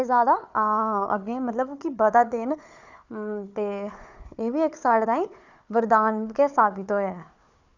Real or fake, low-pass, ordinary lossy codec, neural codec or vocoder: fake; 7.2 kHz; none; codec, 16 kHz, 4 kbps, FunCodec, trained on Chinese and English, 50 frames a second